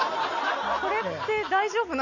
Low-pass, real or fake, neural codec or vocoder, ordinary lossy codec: 7.2 kHz; real; none; Opus, 64 kbps